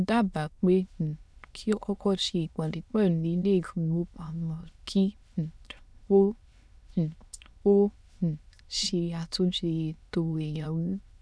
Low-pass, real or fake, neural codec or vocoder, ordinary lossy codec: none; fake; autoencoder, 22.05 kHz, a latent of 192 numbers a frame, VITS, trained on many speakers; none